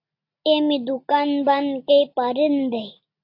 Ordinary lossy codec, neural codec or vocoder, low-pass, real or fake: AAC, 32 kbps; none; 5.4 kHz; real